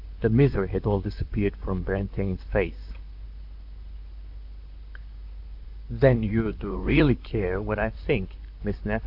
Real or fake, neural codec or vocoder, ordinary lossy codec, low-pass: fake; vocoder, 44.1 kHz, 128 mel bands, Pupu-Vocoder; AAC, 48 kbps; 5.4 kHz